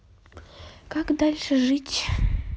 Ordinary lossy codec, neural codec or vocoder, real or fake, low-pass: none; none; real; none